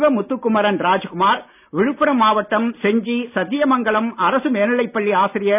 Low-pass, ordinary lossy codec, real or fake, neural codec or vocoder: 3.6 kHz; none; real; none